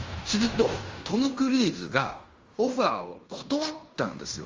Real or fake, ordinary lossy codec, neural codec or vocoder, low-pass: fake; Opus, 32 kbps; codec, 16 kHz in and 24 kHz out, 0.9 kbps, LongCat-Audio-Codec, fine tuned four codebook decoder; 7.2 kHz